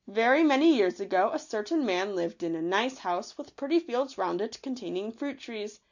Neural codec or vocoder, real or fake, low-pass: none; real; 7.2 kHz